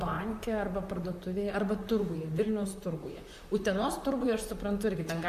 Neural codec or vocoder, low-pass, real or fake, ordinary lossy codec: vocoder, 44.1 kHz, 128 mel bands, Pupu-Vocoder; 14.4 kHz; fake; AAC, 96 kbps